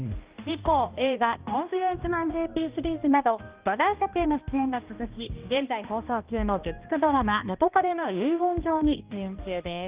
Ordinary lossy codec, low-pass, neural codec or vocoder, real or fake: Opus, 32 kbps; 3.6 kHz; codec, 16 kHz, 1 kbps, X-Codec, HuBERT features, trained on balanced general audio; fake